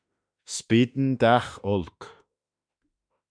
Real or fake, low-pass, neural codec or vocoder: fake; 9.9 kHz; autoencoder, 48 kHz, 32 numbers a frame, DAC-VAE, trained on Japanese speech